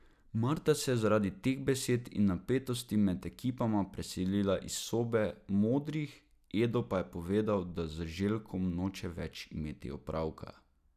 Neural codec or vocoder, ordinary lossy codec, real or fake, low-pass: none; none; real; 14.4 kHz